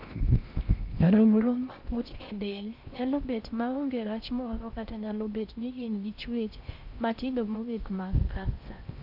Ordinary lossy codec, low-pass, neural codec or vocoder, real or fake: none; 5.4 kHz; codec, 16 kHz in and 24 kHz out, 0.6 kbps, FocalCodec, streaming, 4096 codes; fake